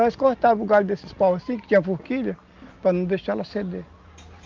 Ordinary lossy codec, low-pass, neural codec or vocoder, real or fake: Opus, 24 kbps; 7.2 kHz; none; real